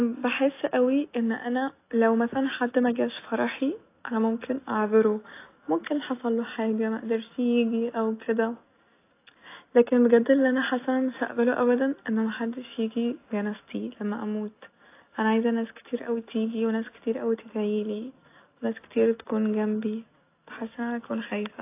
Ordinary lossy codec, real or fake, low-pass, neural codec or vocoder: AAC, 24 kbps; real; 3.6 kHz; none